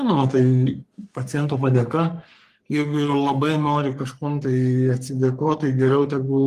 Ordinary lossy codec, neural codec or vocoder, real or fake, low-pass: Opus, 24 kbps; codec, 44.1 kHz, 3.4 kbps, Pupu-Codec; fake; 14.4 kHz